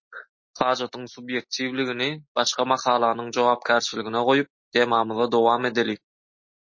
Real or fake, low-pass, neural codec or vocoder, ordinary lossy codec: real; 7.2 kHz; none; MP3, 32 kbps